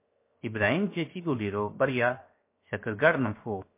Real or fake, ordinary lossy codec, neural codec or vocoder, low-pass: fake; MP3, 24 kbps; codec, 16 kHz, 0.7 kbps, FocalCodec; 3.6 kHz